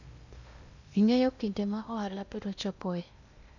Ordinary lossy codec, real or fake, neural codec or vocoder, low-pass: none; fake; codec, 16 kHz in and 24 kHz out, 0.8 kbps, FocalCodec, streaming, 65536 codes; 7.2 kHz